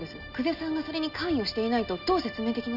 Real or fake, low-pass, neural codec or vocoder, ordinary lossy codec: real; 5.4 kHz; none; none